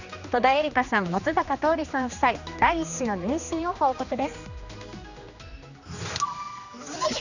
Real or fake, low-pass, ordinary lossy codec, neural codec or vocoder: fake; 7.2 kHz; none; codec, 16 kHz, 2 kbps, X-Codec, HuBERT features, trained on general audio